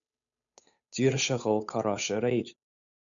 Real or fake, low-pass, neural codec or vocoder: fake; 7.2 kHz; codec, 16 kHz, 8 kbps, FunCodec, trained on Chinese and English, 25 frames a second